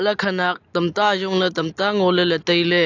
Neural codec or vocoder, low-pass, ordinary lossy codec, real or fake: none; 7.2 kHz; AAC, 48 kbps; real